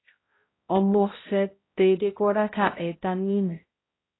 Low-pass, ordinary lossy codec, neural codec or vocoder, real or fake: 7.2 kHz; AAC, 16 kbps; codec, 16 kHz, 0.5 kbps, X-Codec, HuBERT features, trained on balanced general audio; fake